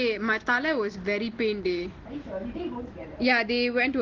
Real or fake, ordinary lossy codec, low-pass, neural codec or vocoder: real; Opus, 16 kbps; 7.2 kHz; none